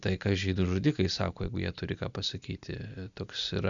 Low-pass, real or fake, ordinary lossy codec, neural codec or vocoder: 7.2 kHz; real; Opus, 64 kbps; none